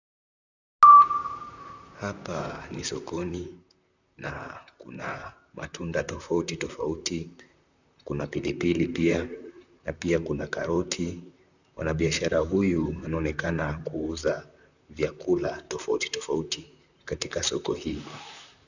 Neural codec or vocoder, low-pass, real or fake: vocoder, 44.1 kHz, 128 mel bands, Pupu-Vocoder; 7.2 kHz; fake